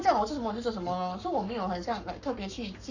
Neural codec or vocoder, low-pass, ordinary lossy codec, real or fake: codec, 44.1 kHz, 7.8 kbps, Pupu-Codec; 7.2 kHz; none; fake